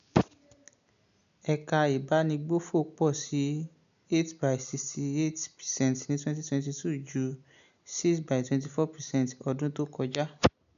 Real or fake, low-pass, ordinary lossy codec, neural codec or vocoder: real; 7.2 kHz; none; none